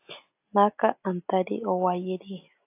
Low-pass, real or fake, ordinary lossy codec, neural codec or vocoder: 3.6 kHz; real; AAC, 24 kbps; none